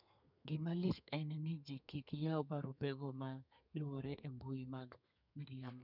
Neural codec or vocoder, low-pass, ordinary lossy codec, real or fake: codec, 32 kHz, 1.9 kbps, SNAC; 5.4 kHz; none; fake